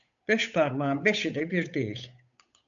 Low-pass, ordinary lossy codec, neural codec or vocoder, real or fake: 7.2 kHz; MP3, 96 kbps; codec, 16 kHz, 8 kbps, FunCodec, trained on Chinese and English, 25 frames a second; fake